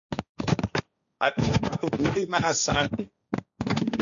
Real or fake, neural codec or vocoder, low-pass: fake; codec, 16 kHz, 1.1 kbps, Voila-Tokenizer; 7.2 kHz